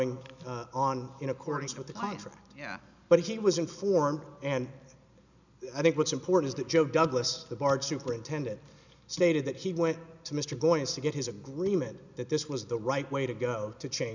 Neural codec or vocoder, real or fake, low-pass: none; real; 7.2 kHz